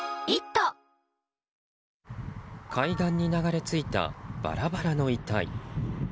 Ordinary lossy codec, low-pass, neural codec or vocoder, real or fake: none; none; none; real